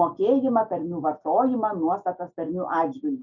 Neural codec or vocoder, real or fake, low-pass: none; real; 7.2 kHz